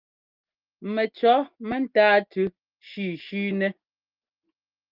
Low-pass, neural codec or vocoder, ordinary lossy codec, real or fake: 5.4 kHz; none; Opus, 24 kbps; real